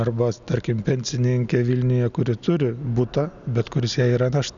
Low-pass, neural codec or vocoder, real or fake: 7.2 kHz; none; real